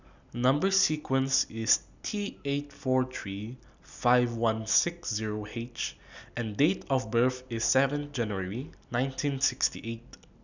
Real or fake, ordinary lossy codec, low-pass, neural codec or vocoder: real; none; 7.2 kHz; none